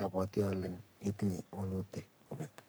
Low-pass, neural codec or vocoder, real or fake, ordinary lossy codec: none; codec, 44.1 kHz, 3.4 kbps, Pupu-Codec; fake; none